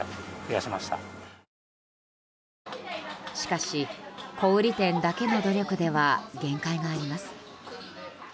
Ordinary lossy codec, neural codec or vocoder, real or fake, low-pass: none; none; real; none